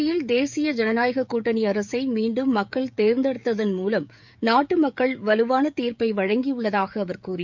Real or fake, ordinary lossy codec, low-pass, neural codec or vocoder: fake; MP3, 64 kbps; 7.2 kHz; codec, 16 kHz, 16 kbps, FreqCodec, smaller model